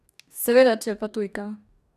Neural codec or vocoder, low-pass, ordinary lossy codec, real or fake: codec, 44.1 kHz, 2.6 kbps, DAC; 14.4 kHz; none; fake